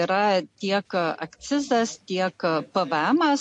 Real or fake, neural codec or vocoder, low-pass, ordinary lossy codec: real; none; 10.8 kHz; MP3, 48 kbps